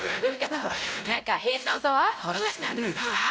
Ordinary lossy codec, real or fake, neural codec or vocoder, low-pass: none; fake; codec, 16 kHz, 0.5 kbps, X-Codec, WavLM features, trained on Multilingual LibriSpeech; none